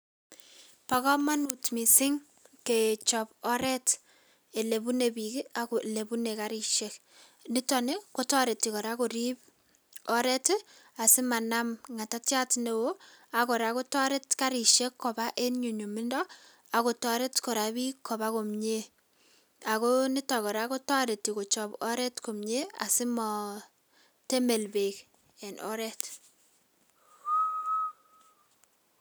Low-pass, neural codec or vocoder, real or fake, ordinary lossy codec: none; none; real; none